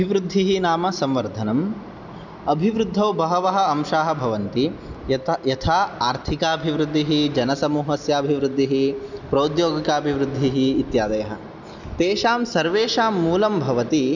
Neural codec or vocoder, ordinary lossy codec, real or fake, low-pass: none; none; real; 7.2 kHz